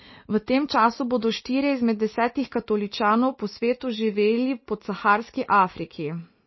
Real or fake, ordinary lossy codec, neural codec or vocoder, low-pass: real; MP3, 24 kbps; none; 7.2 kHz